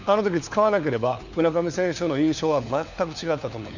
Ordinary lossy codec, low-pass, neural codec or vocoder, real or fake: none; 7.2 kHz; codec, 16 kHz, 4 kbps, FunCodec, trained on LibriTTS, 50 frames a second; fake